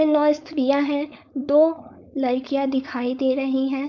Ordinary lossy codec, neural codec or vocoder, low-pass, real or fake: none; codec, 16 kHz, 4.8 kbps, FACodec; 7.2 kHz; fake